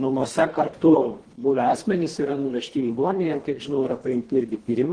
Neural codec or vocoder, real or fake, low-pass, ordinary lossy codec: codec, 24 kHz, 1.5 kbps, HILCodec; fake; 9.9 kHz; Opus, 16 kbps